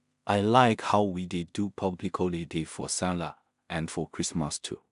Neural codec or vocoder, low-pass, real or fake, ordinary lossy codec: codec, 16 kHz in and 24 kHz out, 0.4 kbps, LongCat-Audio-Codec, two codebook decoder; 10.8 kHz; fake; none